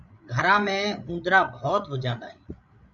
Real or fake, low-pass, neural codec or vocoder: fake; 7.2 kHz; codec, 16 kHz, 8 kbps, FreqCodec, larger model